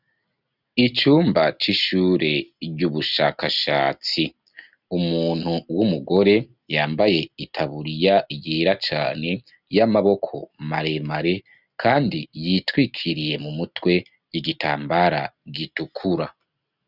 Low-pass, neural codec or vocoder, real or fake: 5.4 kHz; none; real